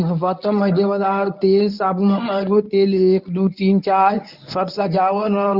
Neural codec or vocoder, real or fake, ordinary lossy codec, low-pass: codec, 24 kHz, 0.9 kbps, WavTokenizer, medium speech release version 1; fake; none; 5.4 kHz